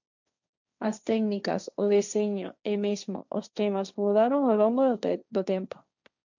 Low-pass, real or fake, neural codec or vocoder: 7.2 kHz; fake; codec, 16 kHz, 1.1 kbps, Voila-Tokenizer